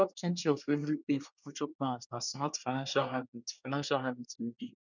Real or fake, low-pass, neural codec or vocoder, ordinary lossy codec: fake; 7.2 kHz; codec, 24 kHz, 1 kbps, SNAC; none